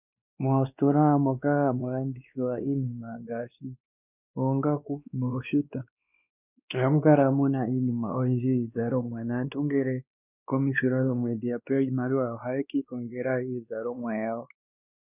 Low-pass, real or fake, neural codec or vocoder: 3.6 kHz; fake; codec, 16 kHz, 2 kbps, X-Codec, WavLM features, trained on Multilingual LibriSpeech